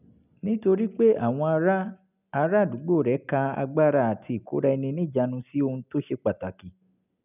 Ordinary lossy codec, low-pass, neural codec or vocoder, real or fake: none; 3.6 kHz; none; real